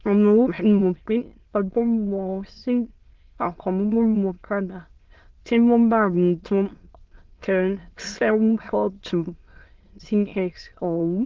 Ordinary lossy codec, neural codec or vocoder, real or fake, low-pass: Opus, 16 kbps; autoencoder, 22.05 kHz, a latent of 192 numbers a frame, VITS, trained on many speakers; fake; 7.2 kHz